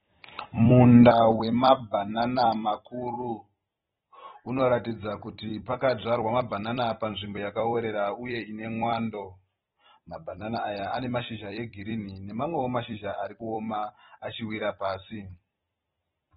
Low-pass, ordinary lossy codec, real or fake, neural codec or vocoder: 19.8 kHz; AAC, 16 kbps; fake; vocoder, 44.1 kHz, 128 mel bands every 256 samples, BigVGAN v2